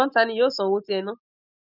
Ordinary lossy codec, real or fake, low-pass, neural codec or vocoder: none; real; 5.4 kHz; none